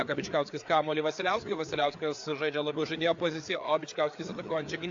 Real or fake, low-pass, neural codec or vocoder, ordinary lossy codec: fake; 7.2 kHz; codec, 16 kHz, 4 kbps, FreqCodec, larger model; AAC, 48 kbps